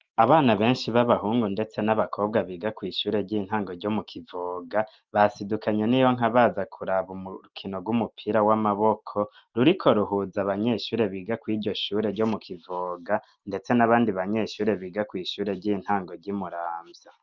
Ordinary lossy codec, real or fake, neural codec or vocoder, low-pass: Opus, 32 kbps; real; none; 7.2 kHz